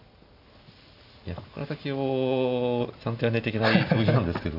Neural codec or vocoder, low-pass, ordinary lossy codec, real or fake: none; 5.4 kHz; AAC, 48 kbps; real